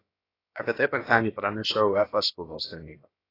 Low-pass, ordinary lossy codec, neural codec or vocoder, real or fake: 5.4 kHz; AAC, 24 kbps; codec, 16 kHz, about 1 kbps, DyCAST, with the encoder's durations; fake